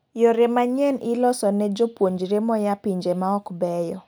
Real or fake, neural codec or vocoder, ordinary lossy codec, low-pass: real; none; none; none